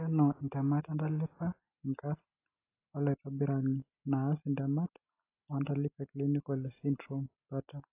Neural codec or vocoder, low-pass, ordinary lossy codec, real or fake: none; 3.6 kHz; AAC, 24 kbps; real